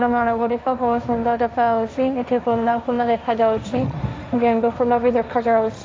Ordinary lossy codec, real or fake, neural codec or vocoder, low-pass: none; fake; codec, 16 kHz, 1.1 kbps, Voila-Tokenizer; none